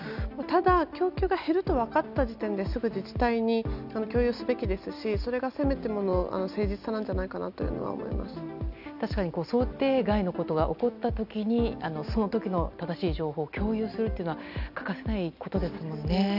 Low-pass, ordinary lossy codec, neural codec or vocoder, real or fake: 5.4 kHz; none; none; real